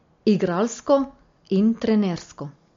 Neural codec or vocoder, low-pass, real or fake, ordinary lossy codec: none; 7.2 kHz; real; MP3, 48 kbps